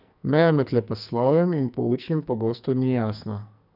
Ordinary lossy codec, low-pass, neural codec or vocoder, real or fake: none; 5.4 kHz; codec, 44.1 kHz, 2.6 kbps, SNAC; fake